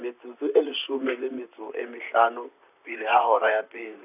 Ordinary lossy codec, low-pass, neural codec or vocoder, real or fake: none; 3.6 kHz; vocoder, 44.1 kHz, 128 mel bands, Pupu-Vocoder; fake